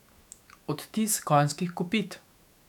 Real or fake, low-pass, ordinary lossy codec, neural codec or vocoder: fake; 19.8 kHz; none; autoencoder, 48 kHz, 128 numbers a frame, DAC-VAE, trained on Japanese speech